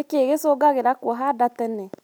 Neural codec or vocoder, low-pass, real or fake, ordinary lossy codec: none; none; real; none